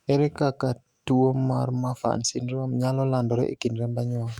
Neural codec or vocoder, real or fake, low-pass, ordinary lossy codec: autoencoder, 48 kHz, 128 numbers a frame, DAC-VAE, trained on Japanese speech; fake; 19.8 kHz; Opus, 64 kbps